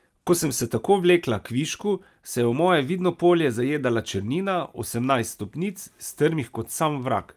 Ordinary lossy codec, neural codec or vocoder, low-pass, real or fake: Opus, 32 kbps; none; 14.4 kHz; real